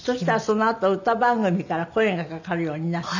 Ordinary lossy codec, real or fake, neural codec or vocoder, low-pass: none; real; none; 7.2 kHz